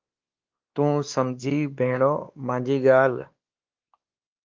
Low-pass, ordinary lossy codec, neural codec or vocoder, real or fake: 7.2 kHz; Opus, 24 kbps; codec, 16 kHz, 2 kbps, X-Codec, WavLM features, trained on Multilingual LibriSpeech; fake